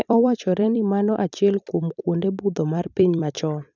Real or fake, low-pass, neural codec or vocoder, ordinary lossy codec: fake; 7.2 kHz; vocoder, 44.1 kHz, 128 mel bands every 256 samples, BigVGAN v2; none